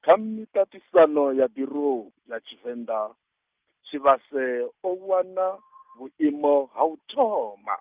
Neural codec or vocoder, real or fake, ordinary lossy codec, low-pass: none; real; Opus, 24 kbps; 3.6 kHz